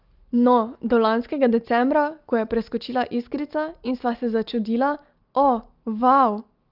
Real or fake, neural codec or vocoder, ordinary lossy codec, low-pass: real; none; Opus, 24 kbps; 5.4 kHz